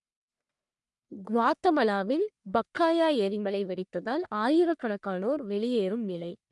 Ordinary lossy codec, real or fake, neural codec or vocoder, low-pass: MP3, 96 kbps; fake; codec, 44.1 kHz, 1.7 kbps, Pupu-Codec; 10.8 kHz